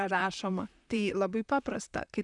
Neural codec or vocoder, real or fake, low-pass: vocoder, 44.1 kHz, 128 mel bands, Pupu-Vocoder; fake; 10.8 kHz